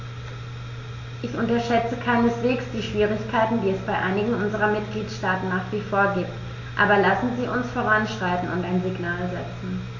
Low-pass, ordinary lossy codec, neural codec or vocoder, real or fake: 7.2 kHz; AAC, 48 kbps; none; real